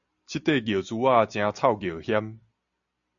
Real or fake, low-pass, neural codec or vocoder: real; 7.2 kHz; none